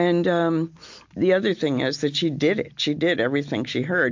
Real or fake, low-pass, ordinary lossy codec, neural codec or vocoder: real; 7.2 kHz; MP3, 48 kbps; none